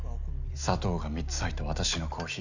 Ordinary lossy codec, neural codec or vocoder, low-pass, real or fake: none; none; 7.2 kHz; real